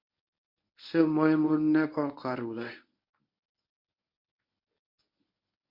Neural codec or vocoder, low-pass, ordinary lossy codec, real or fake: codec, 24 kHz, 0.9 kbps, WavTokenizer, medium speech release version 1; 5.4 kHz; MP3, 32 kbps; fake